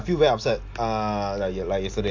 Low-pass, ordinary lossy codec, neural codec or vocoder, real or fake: 7.2 kHz; AAC, 48 kbps; none; real